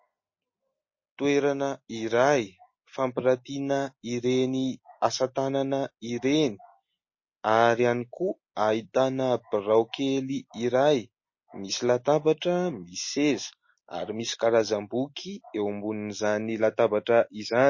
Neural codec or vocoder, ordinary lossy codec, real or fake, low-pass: none; MP3, 32 kbps; real; 7.2 kHz